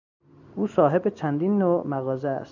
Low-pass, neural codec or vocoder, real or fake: 7.2 kHz; none; real